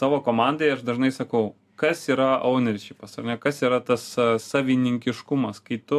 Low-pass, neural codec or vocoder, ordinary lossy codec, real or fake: 14.4 kHz; none; AAC, 96 kbps; real